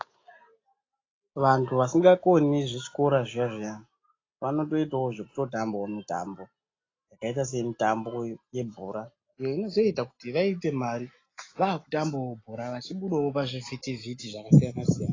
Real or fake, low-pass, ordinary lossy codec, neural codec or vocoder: real; 7.2 kHz; AAC, 32 kbps; none